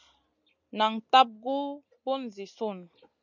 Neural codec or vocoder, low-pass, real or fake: none; 7.2 kHz; real